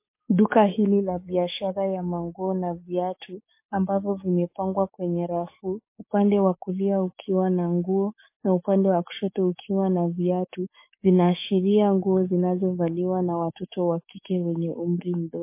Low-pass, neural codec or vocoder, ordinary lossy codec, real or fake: 3.6 kHz; codec, 44.1 kHz, 7.8 kbps, DAC; MP3, 24 kbps; fake